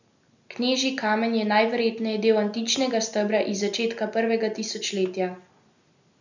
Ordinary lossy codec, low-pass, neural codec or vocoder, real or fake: none; 7.2 kHz; none; real